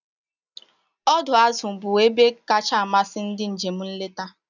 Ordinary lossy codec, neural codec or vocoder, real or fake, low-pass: none; none; real; 7.2 kHz